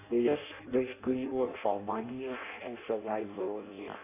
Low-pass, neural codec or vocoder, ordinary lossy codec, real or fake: 3.6 kHz; codec, 16 kHz in and 24 kHz out, 0.6 kbps, FireRedTTS-2 codec; none; fake